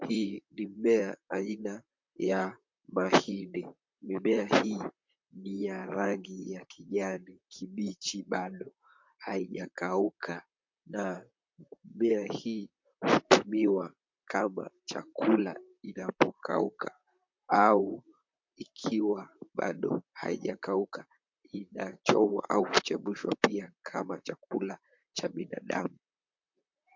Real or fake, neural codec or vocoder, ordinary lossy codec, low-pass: fake; vocoder, 44.1 kHz, 128 mel bands, Pupu-Vocoder; MP3, 64 kbps; 7.2 kHz